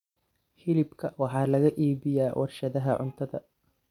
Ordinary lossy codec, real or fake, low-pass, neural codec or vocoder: none; real; 19.8 kHz; none